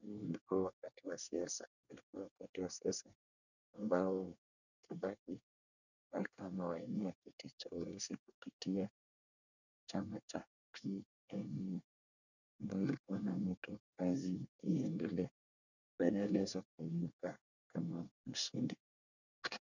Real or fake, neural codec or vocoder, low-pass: fake; codec, 24 kHz, 1 kbps, SNAC; 7.2 kHz